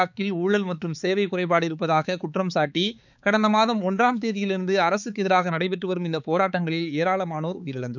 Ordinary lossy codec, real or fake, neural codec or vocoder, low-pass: none; fake; codec, 16 kHz, 4 kbps, X-Codec, HuBERT features, trained on balanced general audio; 7.2 kHz